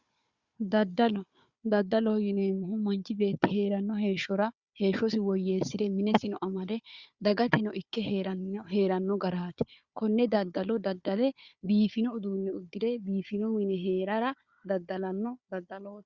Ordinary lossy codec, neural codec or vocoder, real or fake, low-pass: Opus, 64 kbps; codec, 16 kHz, 4 kbps, FunCodec, trained on Chinese and English, 50 frames a second; fake; 7.2 kHz